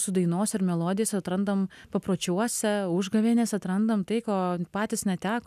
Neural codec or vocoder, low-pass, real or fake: none; 14.4 kHz; real